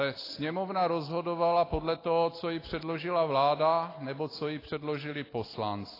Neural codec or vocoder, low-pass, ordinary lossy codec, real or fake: none; 5.4 kHz; AAC, 24 kbps; real